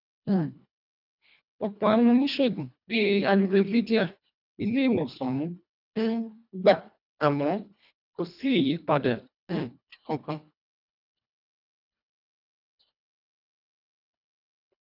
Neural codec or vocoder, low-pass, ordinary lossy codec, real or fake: codec, 24 kHz, 1.5 kbps, HILCodec; 5.4 kHz; none; fake